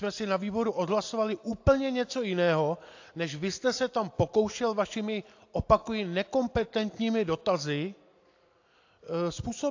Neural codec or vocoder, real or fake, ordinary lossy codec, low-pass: none; real; AAC, 48 kbps; 7.2 kHz